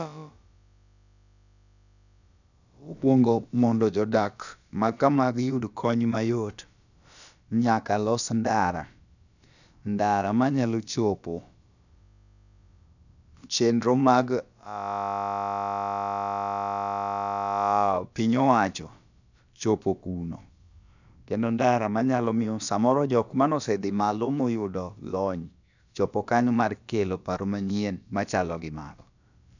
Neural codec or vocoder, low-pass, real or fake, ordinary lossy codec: codec, 16 kHz, about 1 kbps, DyCAST, with the encoder's durations; 7.2 kHz; fake; none